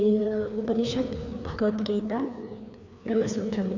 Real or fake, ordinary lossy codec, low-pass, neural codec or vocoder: fake; none; 7.2 kHz; codec, 16 kHz, 2 kbps, FreqCodec, larger model